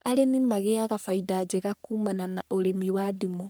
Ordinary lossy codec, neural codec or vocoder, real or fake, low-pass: none; codec, 44.1 kHz, 3.4 kbps, Pupu-Codec; fake; none